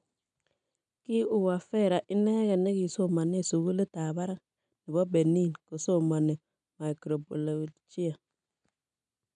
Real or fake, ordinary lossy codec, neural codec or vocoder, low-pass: real; none; none; 9.9 kHz